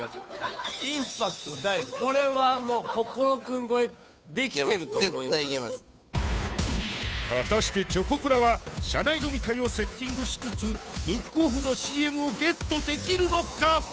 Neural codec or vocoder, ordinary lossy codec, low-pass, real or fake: codec, 16 kHz, 2 kbps, FunCodec, trained on Chinese and English, 25 frames a second; none; none; fake